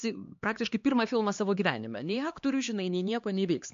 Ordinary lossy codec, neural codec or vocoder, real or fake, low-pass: MP3, 48 kbps; codec, 16 kHz, 2 kbps, X-Codec, HuBERT features, trained on LibriSpeech; fake; 7.2 kHz